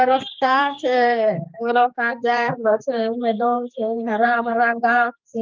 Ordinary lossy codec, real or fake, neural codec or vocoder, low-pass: Opus, 24 kbps; fake; codec, 16 kHz, 2 kbps, X-Codec, HuBERT features, trained on general audio; 7.2 kHz